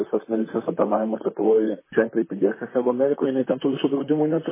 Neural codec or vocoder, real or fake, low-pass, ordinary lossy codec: vocoder, 44.1 kHz, 128 mel bands, Pupu-Vocoder; fake; 3.6 kHz; MP3, 16 kbps